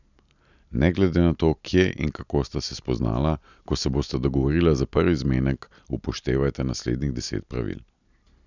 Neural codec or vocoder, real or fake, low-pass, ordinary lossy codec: none; real; 7.2 kHz; none